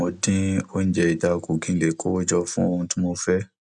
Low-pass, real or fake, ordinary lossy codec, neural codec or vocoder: 9.9 kHz; real; none; none